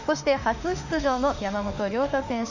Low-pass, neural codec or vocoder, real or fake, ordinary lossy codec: 7.2 kHz; autoencoder, 48 kHz, 32 numbers a frame, DAC-VAE, trained on Japanese speech; fake; none